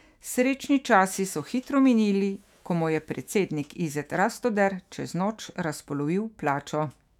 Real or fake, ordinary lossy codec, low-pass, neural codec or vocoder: fake; none; 19.8 kHz; autoencoder, 48 kHz, 128 numbers a frame, DAC-VAE, trained on Japanese speech